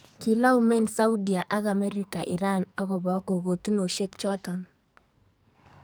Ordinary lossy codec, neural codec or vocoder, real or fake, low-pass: none; codec, 44.1 kHz, 2.6 kbps, SNAC; fake; none